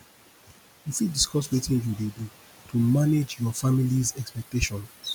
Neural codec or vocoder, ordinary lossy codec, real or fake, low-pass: none; none; real; none